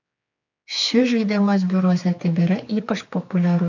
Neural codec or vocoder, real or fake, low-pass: codec, 16 kHz, 4 kbps, X-Codec, HuBERT features, trained on general audio; fake; 7.2 kHz